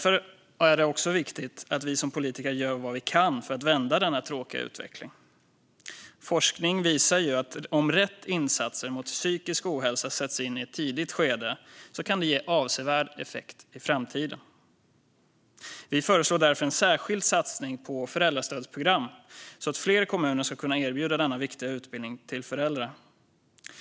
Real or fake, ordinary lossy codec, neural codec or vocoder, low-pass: real; none; none; none